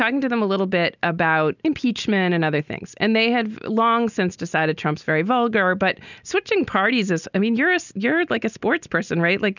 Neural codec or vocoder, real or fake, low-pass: none; real; 7.2 kHz